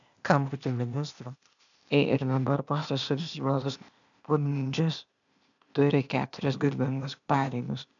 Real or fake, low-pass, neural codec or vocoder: fake; 7.2 kHz; codec, 16 kHz, 0.8 kbps, ZipCodec